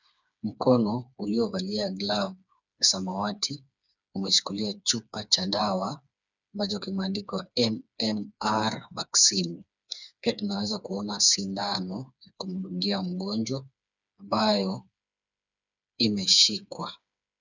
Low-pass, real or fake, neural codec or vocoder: 7.2 kHz; fake; codec, 16 kHz, 4 kbps, FreqCodec, smaller model